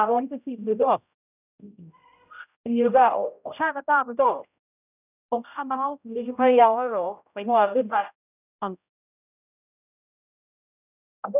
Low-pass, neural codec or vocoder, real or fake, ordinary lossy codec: 3.6 kHz; codec, 16 kHz, 0.5 kbps, X-Codec, HuBERT features, trained on general audio; fake; none